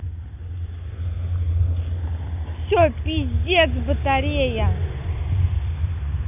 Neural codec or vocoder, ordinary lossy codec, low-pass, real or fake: none; none; 3.6 kHz; real